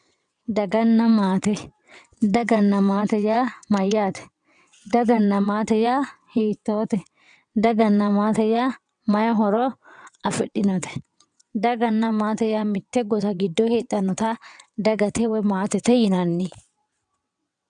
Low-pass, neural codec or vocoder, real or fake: 9.9 kHz; vocoder, 22.05 kHz, 80 mel bands, WaveNeXt; fake